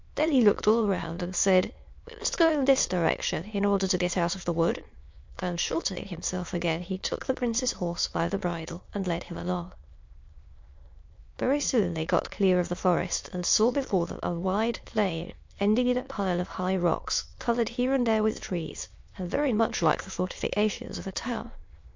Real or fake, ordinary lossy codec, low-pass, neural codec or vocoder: fake; MP3, 48 kbps; 7.2 kHz; autoencoder, 22.05 kHz, a latent of 192 numbers a frame, VITS, trained on many speakers